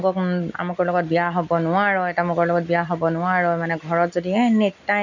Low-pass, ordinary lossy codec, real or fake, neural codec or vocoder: 7.2 kHz; none; real; none